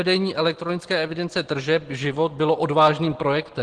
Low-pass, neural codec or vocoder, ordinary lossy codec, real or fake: 9.9 kHz; none; Opus, 16 kbps; real